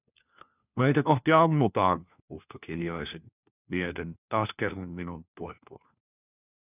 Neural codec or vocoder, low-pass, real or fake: codec, 16 kHz, 1 kbps, FunCodec, trained on LibriTTS, 50 frames a second; 3.6 kHz; fake